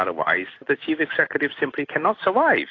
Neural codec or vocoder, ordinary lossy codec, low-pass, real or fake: none; AAC, 32 kbps; 7.2 kHz; real